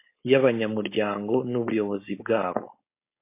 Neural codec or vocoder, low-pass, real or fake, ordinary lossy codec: codec, 16 kHz, 4.8 kbps, FACodec; 3.6 kHz; fake; MP3, 24 kbps